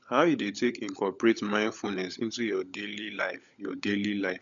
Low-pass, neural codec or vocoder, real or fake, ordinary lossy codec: 7.2 kHz; codec, 16 kHz, 16 kbps, FunCodec, trained on LibriTTS, 50 frames a second; fake; none